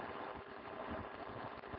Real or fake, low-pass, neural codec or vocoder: fake; 5.4 kHz; codec, 16 kHz, 4.8 kbps, FACodec